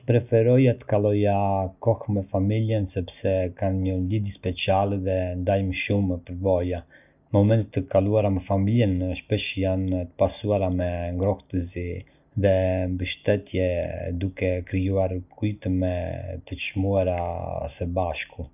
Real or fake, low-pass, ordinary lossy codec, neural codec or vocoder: real; 3.6 kHz; none; none